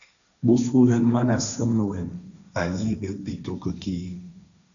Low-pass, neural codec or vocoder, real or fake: 7.2 kHz; codec, 16 kHz, 1.1 kbps, Voila-Tokenizer; fake